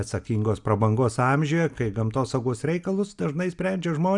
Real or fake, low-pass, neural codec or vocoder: real; 10.8 kHz; none